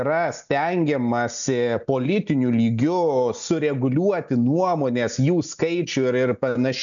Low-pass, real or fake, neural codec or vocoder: 7.2 kHz; real; none